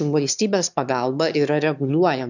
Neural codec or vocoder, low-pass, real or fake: autoencoder, 22.05 kHz, a latent of 192 numbers a frame, VITS, trained on one speaker; 7.2 kHz; fake